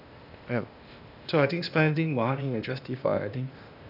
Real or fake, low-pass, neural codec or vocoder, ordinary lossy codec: fake; 5.4 kHz; codec, 16 kHz, 0.8 kbps, ZipCodec; none